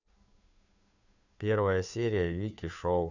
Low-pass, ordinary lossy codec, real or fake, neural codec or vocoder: 7.2 kHz; none; fake; codec, 16 kHz, 2 kbps, FunCodec, trained on Chinese and English, 25 frames a second